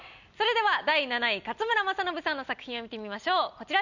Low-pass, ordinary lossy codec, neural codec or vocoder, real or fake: 7.2 kHz; none; none; real